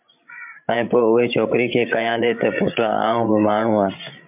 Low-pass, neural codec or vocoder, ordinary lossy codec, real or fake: 3.6 kHz; codec, 16 kHz, 16 kbps, FreqCodec, larger model; MP3, 32 kbps; fake